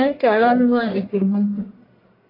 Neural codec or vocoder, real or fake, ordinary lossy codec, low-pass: codec, 44.1 kHz, 1.7 kbps, Pupu-Codec; fake; AAC, 24 kbps; 5.4 kHz